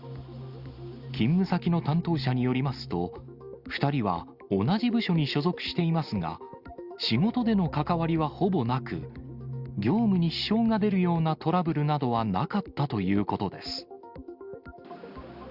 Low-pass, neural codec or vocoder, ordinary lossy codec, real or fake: 5.4 kHz; none; Opus, 64 kbps; real